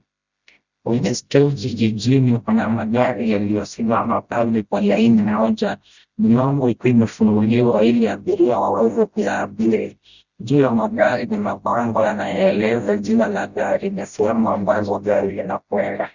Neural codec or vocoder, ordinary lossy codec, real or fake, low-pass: codec, 16 kHz, 0.5 kbps, FreqCodec, smaller model; Opus, 64 kbps; fake; 7.2 kHz